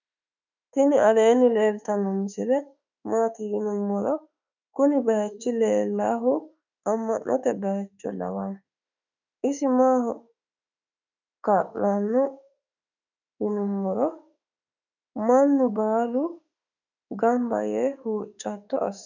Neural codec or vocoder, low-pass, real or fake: autoencoder, 48 kHz, 32 numbers a frame, DAC-VAE, trained on Japanese speech; 7.2 kHz; fake